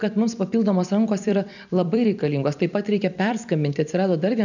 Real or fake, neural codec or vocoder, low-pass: fake; vocoder, 44.1 kHz, 128 mel bands every 256 samples, BigVGAN v2; 7.2 kHz